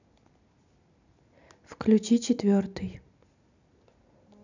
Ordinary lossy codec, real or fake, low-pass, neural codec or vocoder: none; real; 7.2 kHz; none